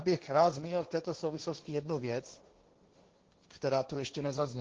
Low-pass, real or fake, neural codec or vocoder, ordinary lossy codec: 7.2 kHz; fake; codec, 16 kHz, 1.1 kbps, Voila-Tokenizer; Opus, 16 kbps